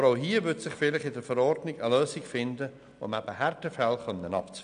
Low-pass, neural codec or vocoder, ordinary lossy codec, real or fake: 9.9 kHz; none; none; real